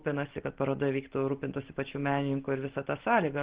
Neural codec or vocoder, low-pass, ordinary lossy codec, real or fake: none; 3.6 kHz; Opus, 16 kbps; real